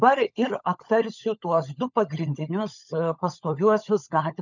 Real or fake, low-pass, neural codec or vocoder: fake; 7.2 kHz; codec, 16 kHz, 16 kbps, FunCodec, trained on LibriTTS, 50 frames a second